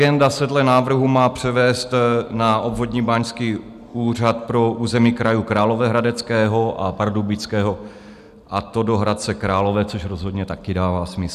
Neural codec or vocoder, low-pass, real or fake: none; 14.4 kHz; real